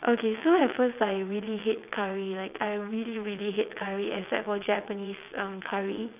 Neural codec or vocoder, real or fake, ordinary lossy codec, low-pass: vocoder, 22.05 kHz, 80 mel bands, WaveNeXt; fake; none; 3.6 kHz